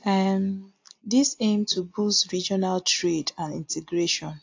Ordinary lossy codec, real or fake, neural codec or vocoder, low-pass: AAC, 48 kbps; real; none; 7.2 kHz